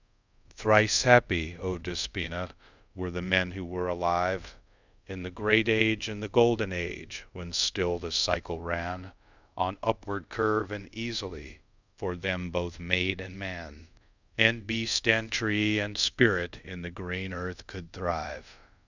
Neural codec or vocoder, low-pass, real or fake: codec, 24 kHz, 0.5 kbps, DualCodec; 7.2 kHz; fake